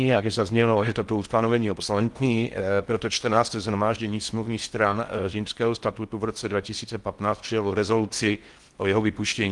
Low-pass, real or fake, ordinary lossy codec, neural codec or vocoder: 10.8 kHz; fake; Opus, 24 kbps; codec, 16 kHz in and 24 kHz out, 0.6 kbps, FocalCodec, streaming, 4096 codes